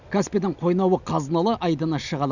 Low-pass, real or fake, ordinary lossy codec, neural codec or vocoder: 7.2 kHz; real; none; none